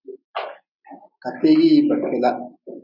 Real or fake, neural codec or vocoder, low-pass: real; none; 5.4 kHz